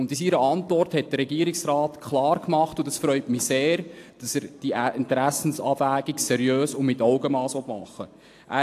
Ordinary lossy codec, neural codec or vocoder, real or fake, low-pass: AAC, 64 kbps; none; real; 14.4 kHz